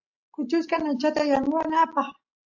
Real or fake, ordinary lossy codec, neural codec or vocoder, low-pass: real; AAC, 48 kbps; none; 7.2 kHz